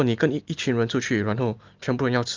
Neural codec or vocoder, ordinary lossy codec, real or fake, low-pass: none; Opus, 24 kbps; real; 7.2 kHz